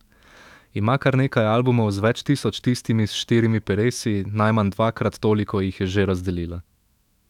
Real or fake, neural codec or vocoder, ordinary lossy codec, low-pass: fake; autoencoder, 48 kHz, 128 numbers a frame, DAC-VAE, trained on Japanese speech; none; 19.8 kHz